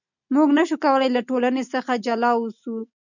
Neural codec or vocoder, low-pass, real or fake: none; 7.2 kHz; real